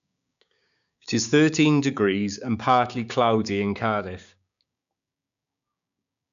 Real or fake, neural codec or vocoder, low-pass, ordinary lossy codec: fake; codec, 16 kHz, 6 kbps, DAC; 7.2 kHz; MP3, 96 kbps